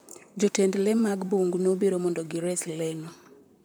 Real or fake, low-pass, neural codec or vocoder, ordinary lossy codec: fake; none; vocoder, 44.1 kHz, 128 mel bands, Pupu-Vocoder; none